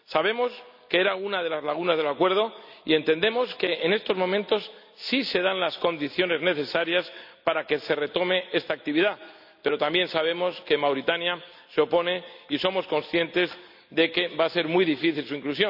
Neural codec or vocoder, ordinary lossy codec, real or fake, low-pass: none; none; real; 5.4 kHz